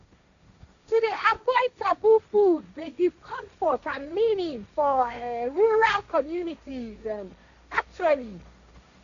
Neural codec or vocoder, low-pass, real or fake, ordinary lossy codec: codec, 16 kHz, 1.1 kbps, Voila-Tokenizer; 7.2 kHz; fake; none